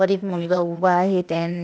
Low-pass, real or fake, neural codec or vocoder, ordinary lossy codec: none; fake; codec, 16 kHz, 0.8 kbps, ZipCodec; none